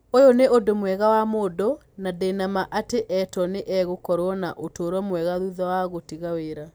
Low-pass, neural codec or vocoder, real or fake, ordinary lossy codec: none; none; real; none